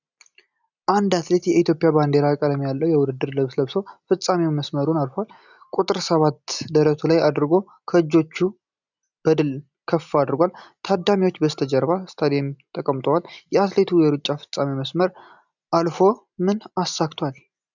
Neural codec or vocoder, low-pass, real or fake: none; 7.2 kHz; real